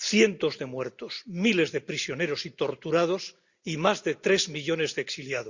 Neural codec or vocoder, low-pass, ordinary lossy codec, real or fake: none; 7.2 kHz; Opus, 64 kbps; real